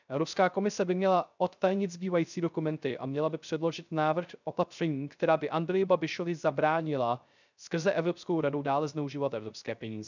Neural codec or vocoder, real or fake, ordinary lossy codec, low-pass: codec, 16 kHz, 0.3 kbps, FocalCodec; fake; none; 7.2 kHz